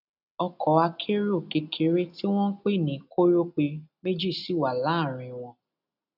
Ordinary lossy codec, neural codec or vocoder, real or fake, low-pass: none; none; real; 5.4 kHz